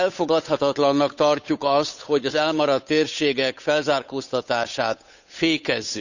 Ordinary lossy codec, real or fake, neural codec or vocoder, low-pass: none; fake; codec, 16 kHz, 8 kbps, FunCodec, trained on Chinese and English, 25 frames a second; 7.2 kHz